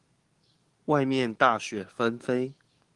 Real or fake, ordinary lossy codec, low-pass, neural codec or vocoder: fake; Opus, 24 kbps; 10.8 kHz; codec, 44.1 kHz, 7.8 kbps, DAC